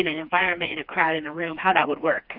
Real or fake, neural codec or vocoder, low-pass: fake; codec, 16 kHz, 2 kbps, FreqCodec, smaller model; 5.4 kHz